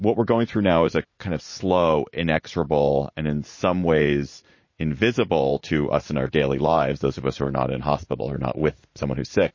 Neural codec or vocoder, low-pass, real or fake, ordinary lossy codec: vocoder, 44.1 kHz, 80 mel bands, Vocos; 7.2 kHz; fake; MP3, 32 kbps